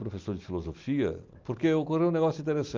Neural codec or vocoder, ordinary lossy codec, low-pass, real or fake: none; Opus, 24 kbps; 7.2 kHz; real